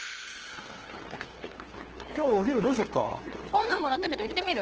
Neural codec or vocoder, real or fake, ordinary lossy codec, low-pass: codec, 16 kHz, 2 kbps, FunCodec, trained on LibriTTS, 25 frames a second; fake; Opus, 16 kbps; 7.2 kHz